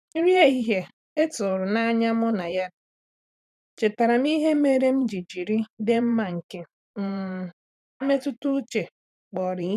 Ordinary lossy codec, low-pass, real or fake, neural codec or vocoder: none; 14.4 kHz; fake; vocoder, 44.1 kHz, 128 mel bands every 512 samples, BigVGAN v2